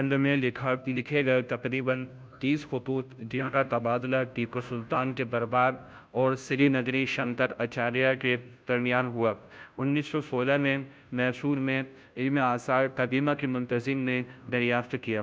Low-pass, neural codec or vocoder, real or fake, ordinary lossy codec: none; codec, 16 kHz, 0.5 kbps, FunCodec, trained on Chinese and English, 25 frames a second; fake; none